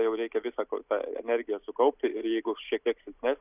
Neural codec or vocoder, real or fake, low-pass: none; real; 3.6 kHz